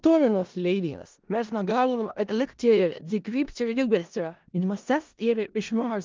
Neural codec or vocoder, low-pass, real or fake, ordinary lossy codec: codec, 16 kHz in and 24 kHz out, 0.4 kbps, LongCat-Audio-Codec, four codebook decoder; 7.2 kHz; fake; Opus, 32 kbps